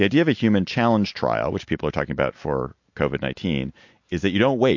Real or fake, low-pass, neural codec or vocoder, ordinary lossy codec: real; 7.2 kHz; none; MP3, 48 kbps